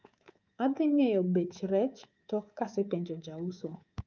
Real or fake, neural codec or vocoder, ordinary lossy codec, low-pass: fake; codec, 24 kHz, 3.1 kbps, DualCodec; Opus, 24 kbps; 7.2 kHz